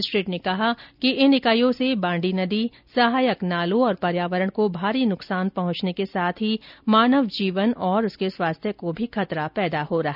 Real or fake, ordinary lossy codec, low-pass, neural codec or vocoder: real; none; 5.4 kHz; none